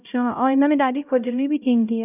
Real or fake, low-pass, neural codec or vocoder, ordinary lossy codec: fake; 3.6 kHz; codec, 16 kHz, 0.5 kbps, X-Codec, HuBERT features, trained on LibriSpeech; none